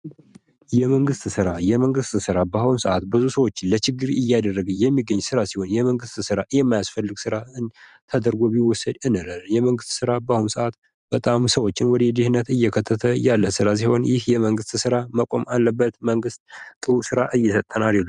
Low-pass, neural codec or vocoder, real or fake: 10.8 kHz; vocoder, 44.1 kHz, 128 mel bands every 512 samples, BigVGAN v2; fake